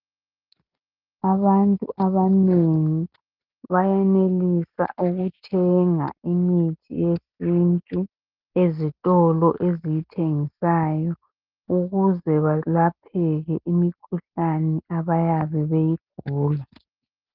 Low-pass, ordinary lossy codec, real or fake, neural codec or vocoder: 5.4 kHz; Opus, 32 kbps; real; none